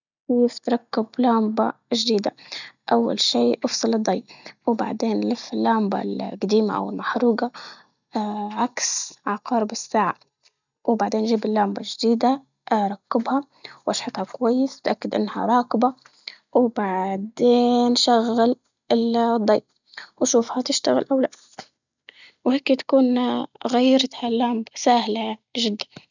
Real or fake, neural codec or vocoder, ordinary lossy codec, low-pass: real; none; none; 7.2 kHz